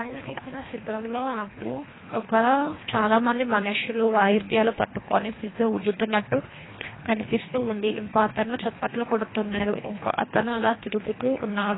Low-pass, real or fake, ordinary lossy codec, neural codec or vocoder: 7.2 kHz; fake; AAC, 16 kbps; codec, 24 kHz, 1.5 kbps, HILCodec